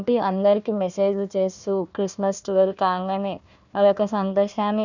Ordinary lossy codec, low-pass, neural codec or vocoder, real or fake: none; 7.2 kHz; codec, 16 kHz, 1 kbps, FunCodec, trained on Chinese and English, 50 frames a second; fake